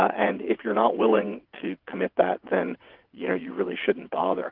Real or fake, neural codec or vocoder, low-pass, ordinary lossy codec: fake; vocoder, 22.05 kHz, 80 mel bands, WaveNeXt; 5.4 kHz; Opus, 24 kbps